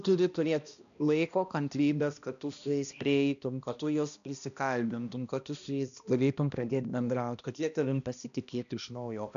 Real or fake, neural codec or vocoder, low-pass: fake; codec, 16 kHz, 1 kbps, X-Codec, HuBERT features, trained on balanced general audio; 7.2 kHz